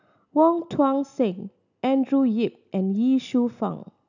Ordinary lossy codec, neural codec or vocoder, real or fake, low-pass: none; none; real; 7.2 kHz